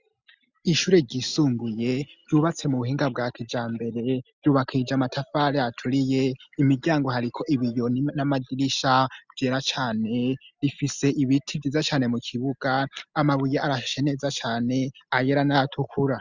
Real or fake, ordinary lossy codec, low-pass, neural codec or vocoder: real; Opus, 64 kbps; 7.2 kHz; none